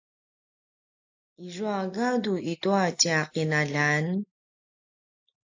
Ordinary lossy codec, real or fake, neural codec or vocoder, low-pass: AAC, 48 kbps; real; none; 7.2 kHz